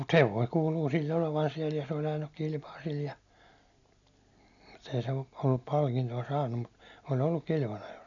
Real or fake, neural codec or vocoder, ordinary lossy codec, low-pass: real; none; none; 7.2 kHz